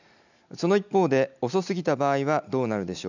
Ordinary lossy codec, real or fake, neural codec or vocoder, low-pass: none; real; none; 7.2 kHz